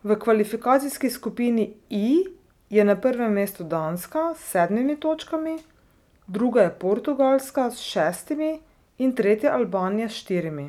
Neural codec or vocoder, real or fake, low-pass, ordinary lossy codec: none; real; 19.8 kHz; none